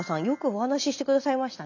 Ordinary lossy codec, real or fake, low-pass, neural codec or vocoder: none; real; 7.2 kHz; none